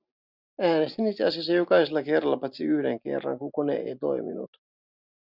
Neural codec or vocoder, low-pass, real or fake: none; 5.4 kHz; real